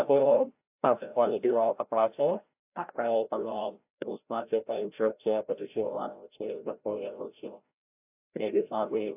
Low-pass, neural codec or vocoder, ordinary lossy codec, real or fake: 3.6 kHz; codec, 16 kHz, 0.5 kbps, FreqCodec, larger model; AAC, 32 kbps; fake